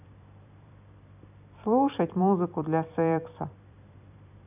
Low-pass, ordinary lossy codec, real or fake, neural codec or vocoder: 3.6 kHz; none; fake; vocoder, 44.1 kHz, 128 mel bands every 256 samples, BigVGAN v2